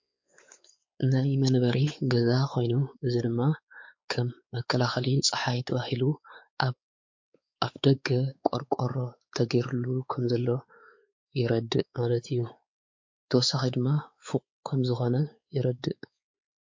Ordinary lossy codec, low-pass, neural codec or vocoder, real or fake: MP3, 48 kbps; 7.2 kHz; codec, 16 kHz, 4 kbps, X-Codec, WavLM features, trained on Multilingual LibriSpeech; fake